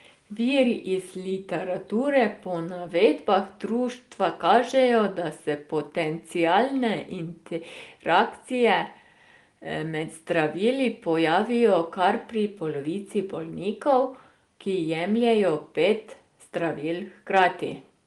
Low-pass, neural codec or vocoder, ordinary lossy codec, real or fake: 10.8 kHz; none; Opus, 24 kbps; real